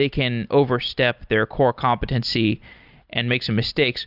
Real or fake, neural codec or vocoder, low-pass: real; none; 5.4 kHz